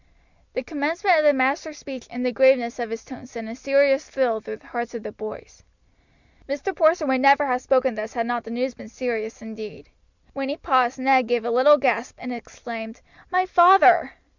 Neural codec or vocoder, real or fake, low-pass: none; real; 7.2 kHz